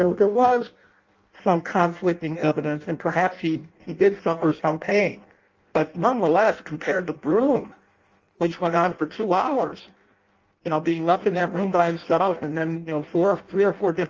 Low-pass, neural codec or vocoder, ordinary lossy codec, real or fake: 7.2 kHz; codec, 16 kHz in and 24 kHz out, 0.6 kbps, FireRedTTS-2 codec; Opus, 32 kbps; fake